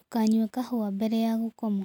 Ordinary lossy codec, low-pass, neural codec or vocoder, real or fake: none; 19.8 kHz; none; real